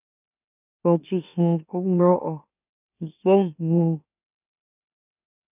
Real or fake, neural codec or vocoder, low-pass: fake; autoencoder, 44.1 kHz, a latent of 192 numbers a frame, MeloTTS; 3.6 kHz